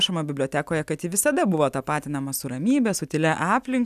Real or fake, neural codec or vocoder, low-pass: real; none; 14.4 kHz